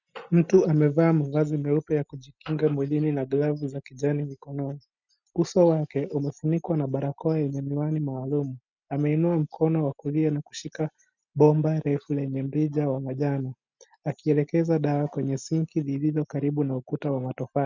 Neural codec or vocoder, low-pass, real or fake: none; 7.2 kHz; real